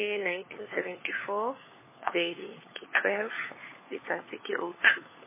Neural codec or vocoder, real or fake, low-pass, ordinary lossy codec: codec, 16 kHz, 4 kbps, FunCodec, trained on LibriTTS, 50 frames a second; fake; 3.6 kHz; MP3, 16 kbps